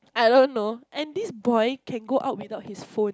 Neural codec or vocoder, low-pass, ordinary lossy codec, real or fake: none; none; none; real